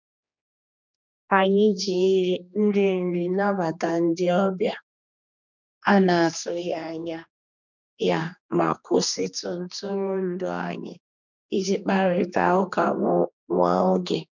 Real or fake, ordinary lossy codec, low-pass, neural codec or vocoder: fake; none; 7.2 kHz; codec, 16 kHz, 2 kbps, X-Codec, HuBERT features, trained on general audio